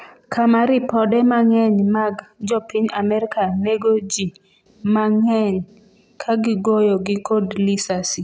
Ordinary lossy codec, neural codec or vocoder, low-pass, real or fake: none; none; none; real